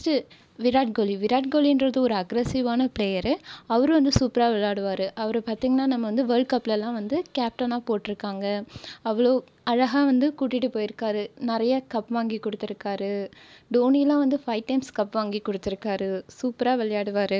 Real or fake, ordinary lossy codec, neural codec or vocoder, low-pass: real; none; none; none